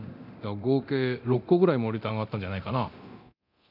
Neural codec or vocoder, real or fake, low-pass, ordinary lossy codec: codec, 24 kHz, 0.9 kbps, DualCodec; fake; 5.4 kHz; none